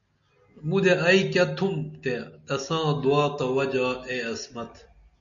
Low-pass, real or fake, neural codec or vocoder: 7.2 kHz; real; none